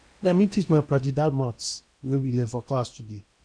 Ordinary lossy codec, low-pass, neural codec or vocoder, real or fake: none; 9.9 kHz; codec, 16 kHz in and 24 kHz out, 0.8 kbps, FocalCodec, streaming, 65536 codes; fake